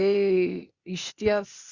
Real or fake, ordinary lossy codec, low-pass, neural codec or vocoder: fake; Opus, 64 kbps; 7.2 kHz; codec, 16 kHz, 0.8 kbps, ZipCodec